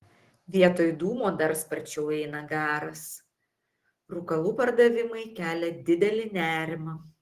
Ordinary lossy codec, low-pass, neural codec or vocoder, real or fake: Opus, 16 kbps; 14.4 kHz; none; real